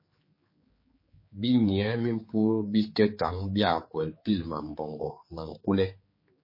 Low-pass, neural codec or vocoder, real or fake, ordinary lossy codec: 5.4 kHz; codec, 16 kHz, 4 kbps, X-Codec, HuBERT features, trained on general audio; fake; MP3, 24 kbps